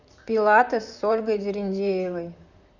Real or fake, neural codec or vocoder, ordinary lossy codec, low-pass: fake; vocoder, 44.1 kHz, 128 mel bands, Pupu-Vocoder; none; 7.2 kHz